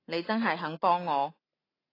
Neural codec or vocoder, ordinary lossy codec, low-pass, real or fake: none; AAC, 24 kbps; 5.4 kHz; real